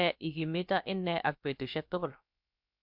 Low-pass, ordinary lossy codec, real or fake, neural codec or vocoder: 5.4 kHz; MP3, 48 kbps; fake; codec, 16 kHz, about 1 kbps, DyCAST, with the encoder's durations